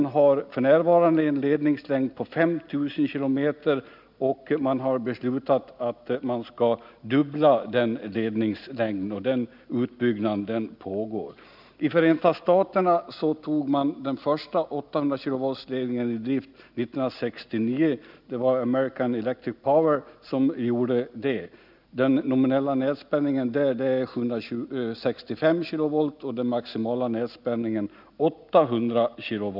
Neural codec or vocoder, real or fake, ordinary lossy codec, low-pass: none; real; none; 5.4 kHz